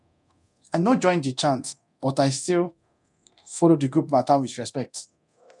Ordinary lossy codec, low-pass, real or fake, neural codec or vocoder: none; 10.8 kHz; fake; codec, 24 kHz, 0.9 kbps, DualCodec